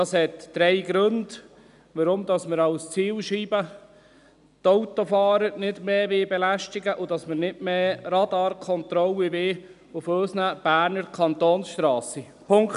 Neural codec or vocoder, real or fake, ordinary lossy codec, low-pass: none; real; none; 10.8 kHz